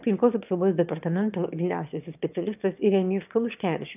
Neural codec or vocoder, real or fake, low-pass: autoencoder, 22.05 kHz, a latent of 192 numbers a frame, VITS, trained on one speaker; fake; 3.6 kHz